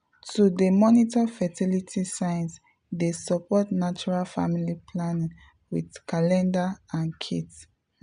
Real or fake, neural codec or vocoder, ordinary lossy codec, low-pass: real; none; none; 9.9 kHz